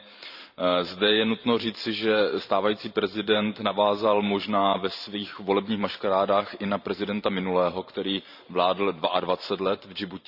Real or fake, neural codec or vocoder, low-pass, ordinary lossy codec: fake; vocoder, 44.1 kHz, 128 mel bands every 512 samples, BigVGAN v2; 5.4 kHz; none